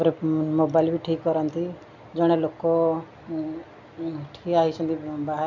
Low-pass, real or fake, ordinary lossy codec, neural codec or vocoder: 7.2 kHz; real; none; none